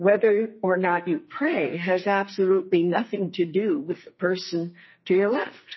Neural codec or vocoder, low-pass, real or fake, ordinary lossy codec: codec, 44.1 kHz, 2.6 kbps, SNAC; 7.2 kHz; fake; MP3, 24 kbps